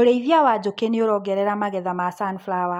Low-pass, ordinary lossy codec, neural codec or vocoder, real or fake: 19.8 kHz; MP3, 64 kbps; none; real